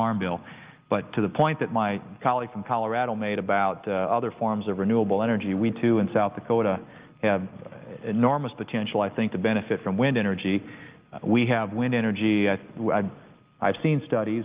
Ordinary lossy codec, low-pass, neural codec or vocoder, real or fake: Opus, 32 kbps; 3.6 kHz; none; real